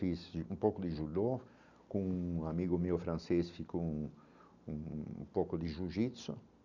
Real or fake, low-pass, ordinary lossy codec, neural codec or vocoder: real; 7.2 kHz; none; none